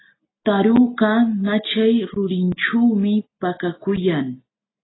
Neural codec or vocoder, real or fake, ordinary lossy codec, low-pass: none; real; AAC, 16 kbps; 7.2 kHz